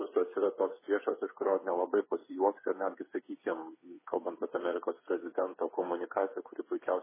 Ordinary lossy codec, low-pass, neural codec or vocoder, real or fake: MP3, 16 kbps; 3.6 kHz; codec, 16 kHz, 8 kbps, FreqCodec, smaller model; fake